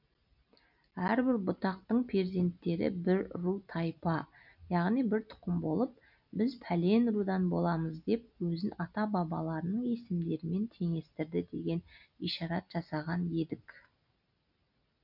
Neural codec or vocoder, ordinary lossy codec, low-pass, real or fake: none; none; 5.4 kHz; real